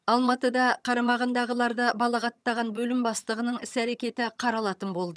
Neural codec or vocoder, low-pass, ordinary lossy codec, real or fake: vocoder, 22.05 kHz, 80 mel bands, HiFi-GAN; none; none; fake